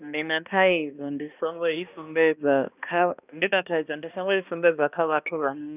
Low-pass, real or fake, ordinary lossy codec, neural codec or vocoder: 3.6 kHz; fake; none; codec, 16 kHz, 1 kbps, X-Codec, HuBERT features, trained on balanced general audio